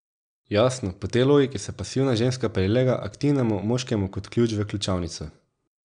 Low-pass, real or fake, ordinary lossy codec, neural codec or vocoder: 9.9 kHz; real; none; none